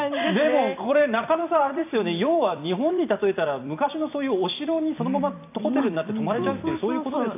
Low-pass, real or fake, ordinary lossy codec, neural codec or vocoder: 3.6 kHz; fake; AAC, 32 kbps; vocoder, 44.1 kHz, 128 mel bands every 256 samples, BigVGAN v2